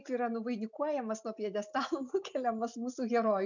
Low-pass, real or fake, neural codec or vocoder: 7.2 kHz; real; none